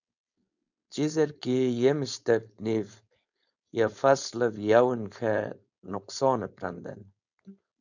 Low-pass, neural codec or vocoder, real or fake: 7.2 kHz; codec, 16 kHz, 4.8 kbps, FACodec; fake